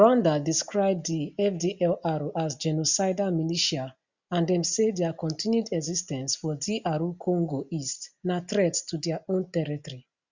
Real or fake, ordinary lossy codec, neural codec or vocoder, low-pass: real; Opus, 64 kbps; none; 7.2 kHz